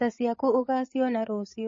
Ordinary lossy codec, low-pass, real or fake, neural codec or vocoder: MP3, 32 kbps; 7.2 kHz; fake; codec, 16 kHz, 8 kbps, FreqCodec, larger model